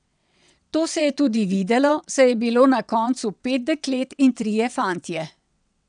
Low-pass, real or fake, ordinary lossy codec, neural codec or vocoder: 9.9 kHz; fake; none; vocoder, 22.05 kHz, 80 mel bands, WaveNeXt